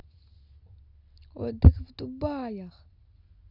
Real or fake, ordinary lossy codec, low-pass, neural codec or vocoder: real; Opus, 64 kbps; 5.4 kHz; none